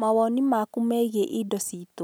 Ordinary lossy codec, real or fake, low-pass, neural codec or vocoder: none; real; none; none